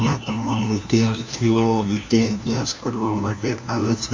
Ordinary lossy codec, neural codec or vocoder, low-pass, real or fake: MP3, 64 kbps; codec, 16 kHz, 1 kbps, FunCodec, trained on LibriTTS, 50 frames a second; 7.2 kHz; fake